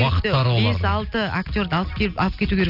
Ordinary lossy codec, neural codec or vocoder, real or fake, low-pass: none; none; real; 5.4 kHz